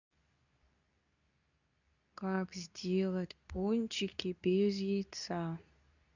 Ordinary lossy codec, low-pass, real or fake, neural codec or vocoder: none; 7.2 kHz; fake; codec, 24 kHz, 0.9 kbps, WavTokenizer, medium speech release version 2